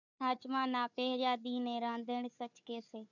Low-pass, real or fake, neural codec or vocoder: 7.2 kHz; fake; codec, 16 kHz, 4 kbps, FunCodec, trained on Chinese and English, 50 frames a second